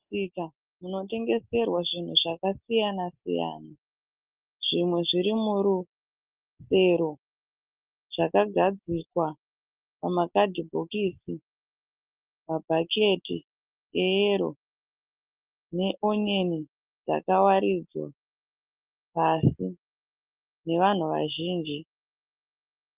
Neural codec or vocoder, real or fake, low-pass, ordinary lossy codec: none; real; 3.6 kHz; Opus, 24 kbps